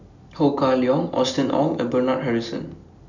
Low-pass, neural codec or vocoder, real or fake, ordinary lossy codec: 7.2 kHz; none; real; none